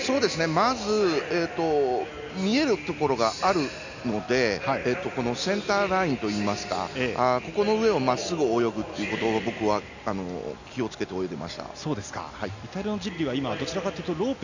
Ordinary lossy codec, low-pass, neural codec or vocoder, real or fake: none; 7.2 kHz; none; real